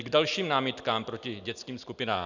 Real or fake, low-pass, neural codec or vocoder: real; 7.2 kHz; none